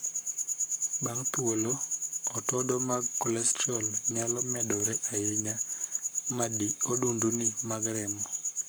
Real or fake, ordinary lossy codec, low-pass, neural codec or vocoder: fake; none; none; codec, 44.1 kHz, 7.8 kbps, Pupu-Codec